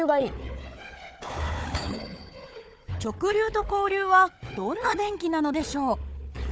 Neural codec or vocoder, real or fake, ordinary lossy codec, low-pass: codec, 16 kHz, 4 kbps, FunCodec, trained on Chinese and English, 50 frames a second; fake; none; none